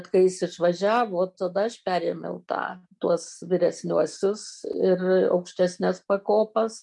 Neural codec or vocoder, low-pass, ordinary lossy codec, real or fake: none; 10.8 kHz; MP3, 64 kbps; real